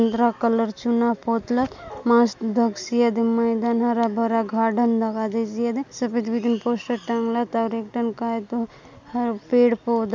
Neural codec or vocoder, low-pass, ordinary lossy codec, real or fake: none; none; none; real